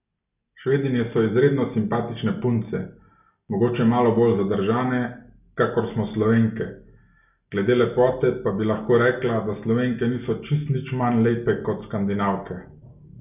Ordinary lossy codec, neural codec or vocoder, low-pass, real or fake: none; none; 3.6 kHz; real